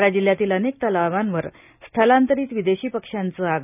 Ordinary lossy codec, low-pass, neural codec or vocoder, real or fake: none; 3.6 kHz; none; real